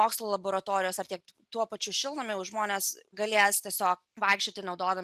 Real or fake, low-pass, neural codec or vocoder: real; 14.4 kHz; none